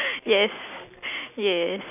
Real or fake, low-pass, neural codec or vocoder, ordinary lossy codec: real; 3.6 kHz; none; none